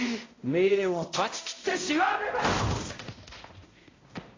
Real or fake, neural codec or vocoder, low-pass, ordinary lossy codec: fake; codec, 16 kHz, 0.5 kbps, X-Codec, HuBERT features, trained on balanced general audio; 7.2 kHz; AAC, 32 kbps